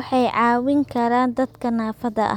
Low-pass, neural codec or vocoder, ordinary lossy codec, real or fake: 19.8 kHz; none; none; real